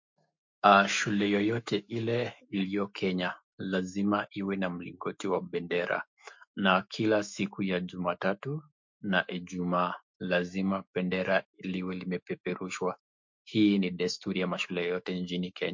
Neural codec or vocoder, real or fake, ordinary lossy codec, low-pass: autoencoder, 48 kHz, 128 numbers a frame, DAC-VAE, trained on Japanese speech; fake; MP3, 48 kbps; 7.2 kHz